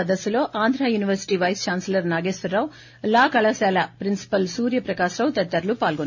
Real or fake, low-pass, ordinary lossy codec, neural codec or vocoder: real; 7.2 kHz; none; none